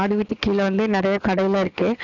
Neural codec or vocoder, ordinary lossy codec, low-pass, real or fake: none; none; 7.2 kHz; real